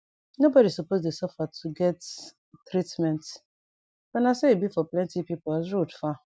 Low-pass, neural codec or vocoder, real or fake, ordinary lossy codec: none; none; real; none